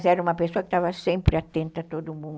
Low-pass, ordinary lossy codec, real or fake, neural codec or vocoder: none; none; real; none